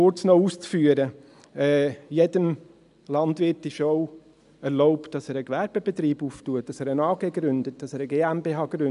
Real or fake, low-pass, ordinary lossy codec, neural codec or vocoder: real; 10.8 kHz; none; none